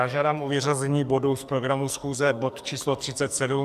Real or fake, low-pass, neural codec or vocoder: fake; 14.4 kHz; codec, 44.1 kHz, 2.6 kbps, SNAC